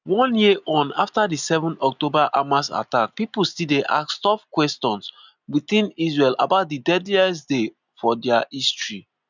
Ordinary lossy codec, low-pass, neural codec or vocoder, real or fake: none; 7.2 kHz; none; real